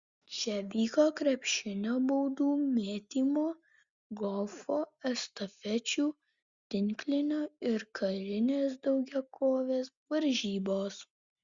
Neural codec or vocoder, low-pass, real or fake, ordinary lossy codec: none; 7.2 kHz; real; Opus, 64 kbps